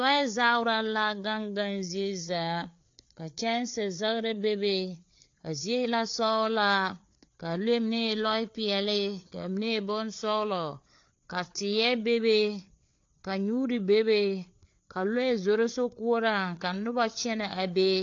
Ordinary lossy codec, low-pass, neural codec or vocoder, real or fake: AAC, 48 kbps; 7.2 kHz; codec, 16 kHz, 4 kbps, FreqCodec, larger model; fake